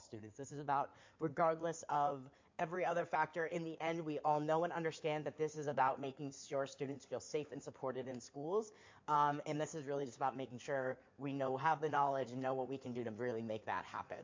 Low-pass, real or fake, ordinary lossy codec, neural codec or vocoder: 7.2 kHz; fake; MP3, 48 kbps; codec, 16 kHz in and 24 kHz out, 2.2 kbps, FireRedTTS-2 codec